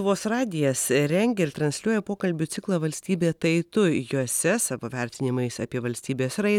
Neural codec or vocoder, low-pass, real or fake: none; 19.8 kHz; real